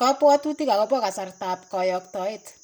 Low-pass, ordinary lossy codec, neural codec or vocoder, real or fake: none; none; none; real